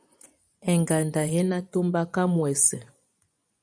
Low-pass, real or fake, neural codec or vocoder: 9.9 kHz; fake; vocoder, 24 kHz, 100 mel bands, Vocos